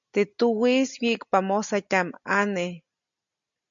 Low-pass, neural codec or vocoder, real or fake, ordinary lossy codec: 7.2 kHz; none; real; MP3, 64 kbps